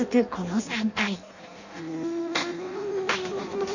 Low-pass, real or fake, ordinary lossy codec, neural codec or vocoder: 7.2 kHz; fake; none; codec, 16 kHz in and 24 kHz out, 0.6 kbps, FireRedTTS-2 codec